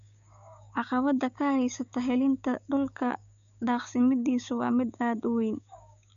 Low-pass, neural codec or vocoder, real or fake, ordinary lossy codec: 7.2 kHz; codec, 16 kHz, 6 kbps, DAC; fake; none